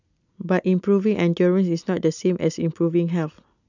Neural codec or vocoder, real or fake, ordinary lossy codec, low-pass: none; real; none; 7.2 kHz